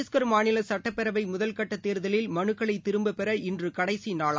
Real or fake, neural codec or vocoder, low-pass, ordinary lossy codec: real; none; none; none